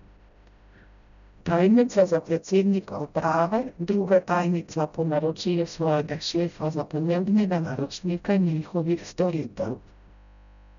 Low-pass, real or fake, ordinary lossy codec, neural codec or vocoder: 7.2 kHz; fake; none; codec, 16 kHz, 0.5 kbps, FreqCodec, smaller model